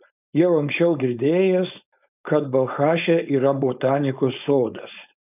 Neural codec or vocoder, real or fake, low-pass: codec, 16 kHz, 4.8 kbps, FACodec; fake; 3.6 kHz